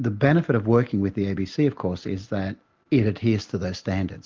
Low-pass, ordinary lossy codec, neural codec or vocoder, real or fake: 7.2 kHz; Opus, 32 kbps; none; real